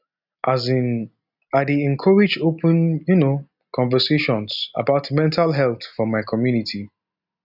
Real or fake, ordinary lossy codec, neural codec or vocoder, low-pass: real; none; none; 5.4 kHz